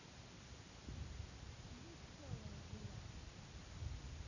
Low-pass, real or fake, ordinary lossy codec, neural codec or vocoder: 7.2 kHz; real; none; none